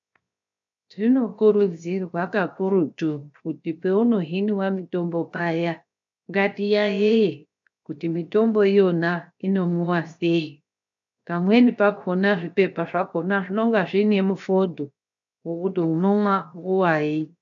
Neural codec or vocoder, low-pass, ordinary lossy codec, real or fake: codec, 16 kHz, 0.7 kbps, FocalCodec; 7.2 kHz; AAC, 64 kbps; fake